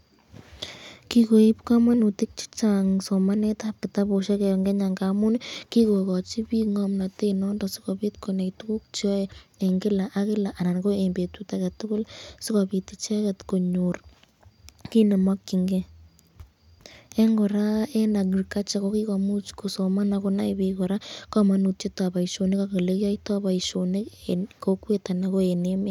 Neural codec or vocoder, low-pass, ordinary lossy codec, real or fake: none; 19.8 kHz; none; real